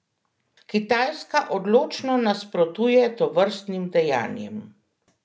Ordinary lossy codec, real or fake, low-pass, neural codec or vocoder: none; real; none; none